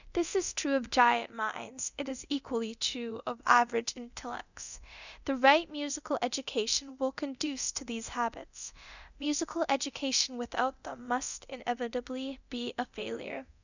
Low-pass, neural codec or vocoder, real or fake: 7.2 kHz; codec, 24 kHz, 0.9 kbps, DualCodec; fake